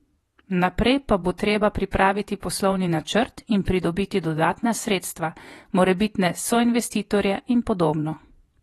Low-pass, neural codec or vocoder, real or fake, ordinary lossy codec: 19.8 kHz; vocoder, 48 kHz, 128 mel bands, Vocos; fake; AAC, 32 kbps